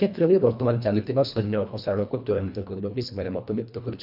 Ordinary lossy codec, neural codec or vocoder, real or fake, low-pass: none; codec, 24 kHz, 1.5 kbps, HILCodec; fake; 5.4 kHz